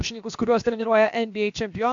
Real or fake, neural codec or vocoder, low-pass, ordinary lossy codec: fake; codec, 16 kHz, about 1 kbps, DyCAST, with the encoder's durations; 7.2 kHz; MP3, 64 kbps